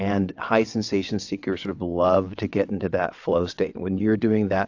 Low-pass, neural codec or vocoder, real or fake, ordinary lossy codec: 7.2 kHz; vocoder, 22.05 kHz, 80 mel bands, WaveNeXt; fake; AAC, 48 kbps